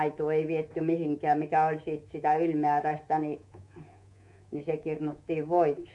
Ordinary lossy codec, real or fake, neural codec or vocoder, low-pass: MP3, 64 kbps; fake; codec, 24 kHz, 3.1 kbps, DualCodec; 10.8 kHz